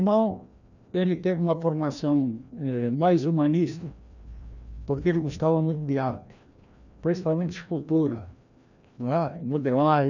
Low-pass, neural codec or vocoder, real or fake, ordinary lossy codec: 7.2 kHz; codec, 16 kHz, 1 kbps, FreqCodec, larger model; fake; none